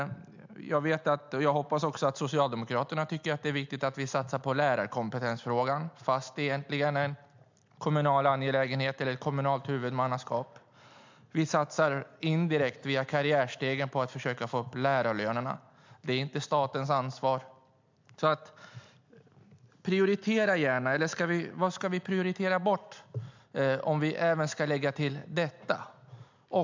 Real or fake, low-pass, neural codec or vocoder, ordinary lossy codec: real; 7.2 kHz; none; MP3, 64 kbps